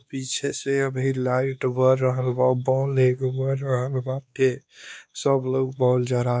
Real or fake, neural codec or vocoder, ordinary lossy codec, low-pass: fake; codec, 16 kHz, 2 kbps, X-Codec, WavLM features, trained on Multilingual LibriSpeech; none; none